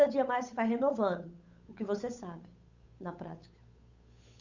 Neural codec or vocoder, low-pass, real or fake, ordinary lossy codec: codec, 16 kHz, 8 kbps, FunCodec, trained on Chinese and English, 25 frames a second; 7.2 kHz; fake; Opus, 64 kbps